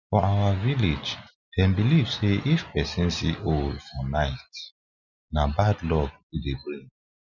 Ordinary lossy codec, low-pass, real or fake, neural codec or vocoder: none; 7.2 kHz; real; none